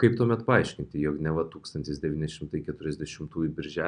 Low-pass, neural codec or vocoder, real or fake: 9.9 kHz; none; real